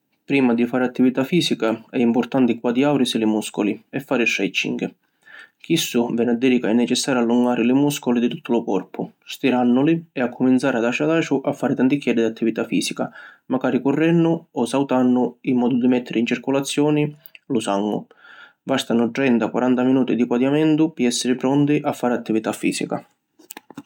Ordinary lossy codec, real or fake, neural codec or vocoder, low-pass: none; real; none; 19.8 kHz